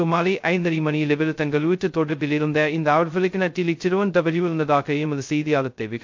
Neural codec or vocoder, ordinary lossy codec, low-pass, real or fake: codec, 16 kHz, 0.2 kbps, FocalCodec; MP3, 48 kbps; 7.2 kHz; fake